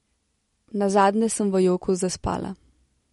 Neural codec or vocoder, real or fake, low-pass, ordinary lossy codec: none; real; 14.4 kHz; MP3, 48 kbps